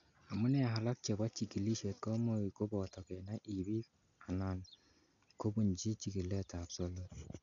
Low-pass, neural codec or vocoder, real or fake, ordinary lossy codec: 7.2 kHz; none; real; none